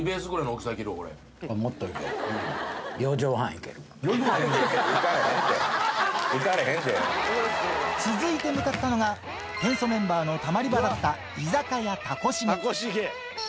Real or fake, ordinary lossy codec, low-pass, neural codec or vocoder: real; none; none; none